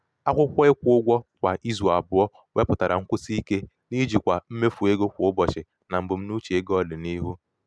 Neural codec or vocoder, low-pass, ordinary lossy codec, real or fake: none; none; none; real